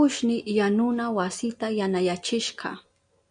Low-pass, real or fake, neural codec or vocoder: 9.9 kHz; real; none